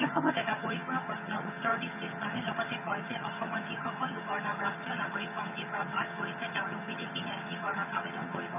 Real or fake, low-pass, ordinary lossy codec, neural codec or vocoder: fake; 3.6 kHz; none; vocoder, 22.05 kHz, 80 mel bands, HiFi-GAN